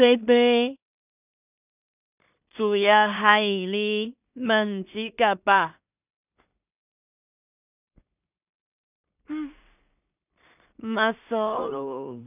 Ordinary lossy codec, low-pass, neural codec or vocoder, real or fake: none; 3.6 kHz; codec, 16 kHz in and 24 kHz out, 0.4 kbps, LongCat-Audio-Codec, two codebook decoder; fake